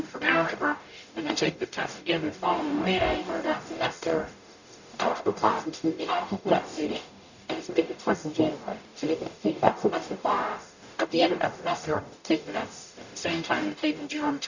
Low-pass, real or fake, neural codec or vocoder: 7.2 kHz; fake; codec, 44.1 kHz, 0.9 kbps, DAC